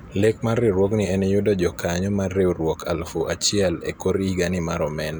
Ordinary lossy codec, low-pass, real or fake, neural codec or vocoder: none; none; real; none